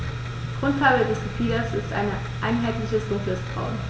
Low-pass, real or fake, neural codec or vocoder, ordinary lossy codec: none; real; none; none